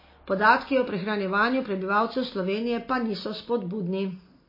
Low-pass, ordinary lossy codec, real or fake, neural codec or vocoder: 5.4 kHz; MP3, 24 kbps; real; none